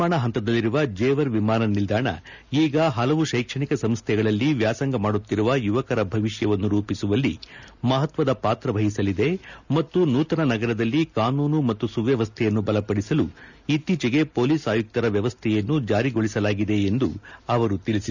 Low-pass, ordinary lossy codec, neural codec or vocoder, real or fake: 7.2 kHz; none; none; real